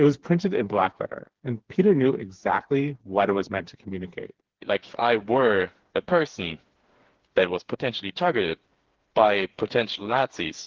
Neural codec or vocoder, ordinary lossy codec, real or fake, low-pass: codec, 16 kHz, 4 kbps, FreqCodec, smaller model; Opus, 16 kbps; fake; 7.2 kHz